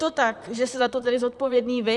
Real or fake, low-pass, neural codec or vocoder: fake; 10.8 kHz; vocoder, 44.1 kHz, 128 mel bands, Pupu-Vocoder